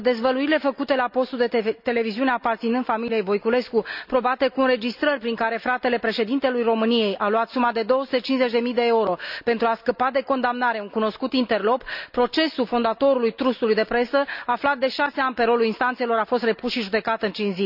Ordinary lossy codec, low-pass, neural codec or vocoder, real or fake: none; 5.4 kHz; none; real